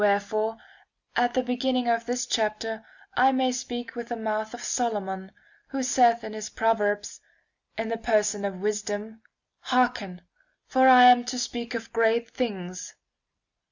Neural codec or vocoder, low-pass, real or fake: none; 7.2 kHz; real